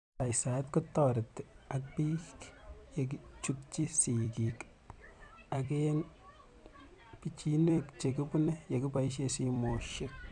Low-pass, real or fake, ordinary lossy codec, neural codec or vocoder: 10.8 kHz; fake; none; vocoder, 44.1 kHz, 128 mel bands every 256 samples, BigVGAN v2